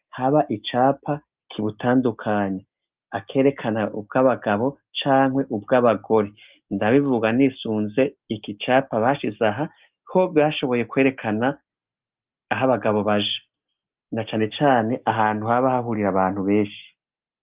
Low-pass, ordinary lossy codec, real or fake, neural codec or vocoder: 3.6 kHz; Opus, 32 kbps; real; none